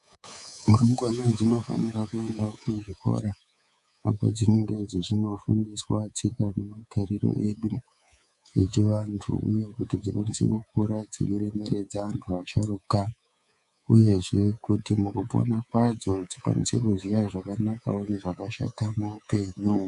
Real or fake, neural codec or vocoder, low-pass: fake; codec, 24 kHz, 3.1 kbps, DualCodec; 10.8 kHz